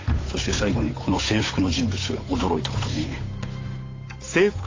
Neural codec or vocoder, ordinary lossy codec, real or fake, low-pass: codec, 16 kHz, 8 kbps, FunCodec, trained on Chinese and English, 25 frames a second; AAC, 32 kbps; fake; 7.2 kHz